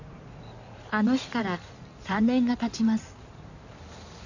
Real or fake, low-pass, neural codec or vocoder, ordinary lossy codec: fake; 7.2 kHz; codec, 16 kHz in and 24 kHz out, 2.2 kbps, FireRedTTS-2 codec; none